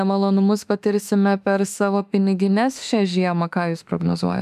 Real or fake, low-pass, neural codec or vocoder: fake; 14.4 kHz; autoencoder, 48 kHz, 32 numbers a frame, DAC-VAE, trained on Japanese speech